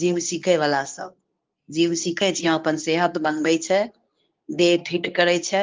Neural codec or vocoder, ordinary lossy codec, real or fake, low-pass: codec, 24 kHz, 0.9 kbps, WavTokenizer, medium speech release version 2; Opus, 32 kbps; fake; 7.2 kHz